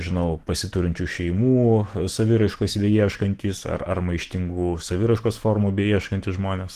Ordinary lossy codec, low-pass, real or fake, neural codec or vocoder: Opus, 16 kbps; 14.4 kHz; fake; autoencoder, 48 kHz, 128 numbers a frame, DAC-VAE, trained on Japanese speech